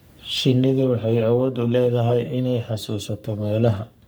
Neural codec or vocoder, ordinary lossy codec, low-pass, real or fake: codec, 44.1 kHz, 3.4 kbps, Pupu-Codec; none; none; fake